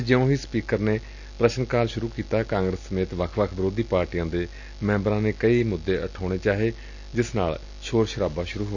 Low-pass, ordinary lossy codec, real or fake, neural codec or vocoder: 7.2 kHz; none; real; none